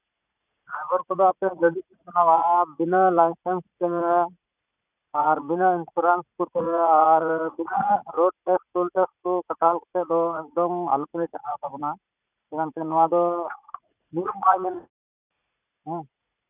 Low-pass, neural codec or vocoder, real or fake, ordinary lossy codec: 3.6 kHz; vocoder, 44.1 kHz, 80 mel bands, Vocos; fake; none